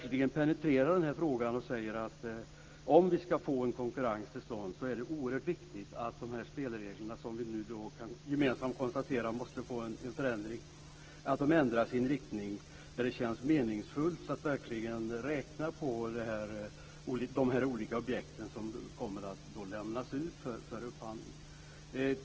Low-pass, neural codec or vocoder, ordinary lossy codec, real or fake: 7.2 kHz; none; Opus, 16 kbps; real